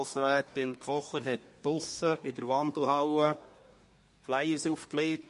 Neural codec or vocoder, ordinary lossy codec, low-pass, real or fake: codec, 24 kHz, 1 kbps, SNAC; MP3, 48 kbps; 10.8 kHz; fake